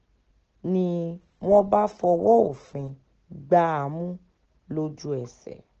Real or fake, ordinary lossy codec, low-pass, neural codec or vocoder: real; Opus, 16 kbps; 7.2 kHz; none